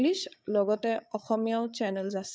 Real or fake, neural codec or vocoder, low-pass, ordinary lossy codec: fake; codec, 16 kHz, 8 kbps, FreqCodec, larger model; none; none